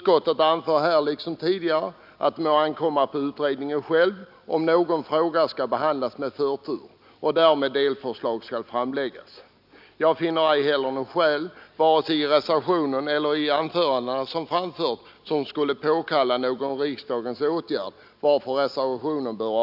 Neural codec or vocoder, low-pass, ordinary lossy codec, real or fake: none; 5.4 kHz; none; real